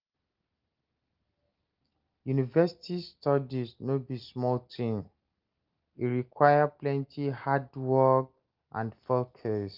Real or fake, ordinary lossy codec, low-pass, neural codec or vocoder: real; Opus, 32 kbps; 5.4 kHz; none